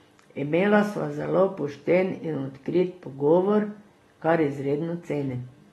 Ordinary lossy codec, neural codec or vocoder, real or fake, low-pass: AAC, 32 kbps; none; real; 19.8 kHz